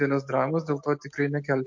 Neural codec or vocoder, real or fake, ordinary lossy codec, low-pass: none; real; MP3, 48 kbps; 7.2 kHz